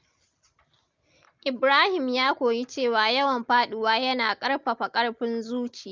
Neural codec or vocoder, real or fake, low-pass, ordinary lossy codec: none; real; 7.2 kHz; Opus, 24 kbps